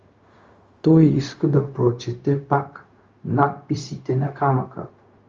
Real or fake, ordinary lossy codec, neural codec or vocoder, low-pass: fake; Opus, 32 kbps; codec, 16 kHz, 0.4 kbps, LongCat-Audio-Codec; 7.2 kHz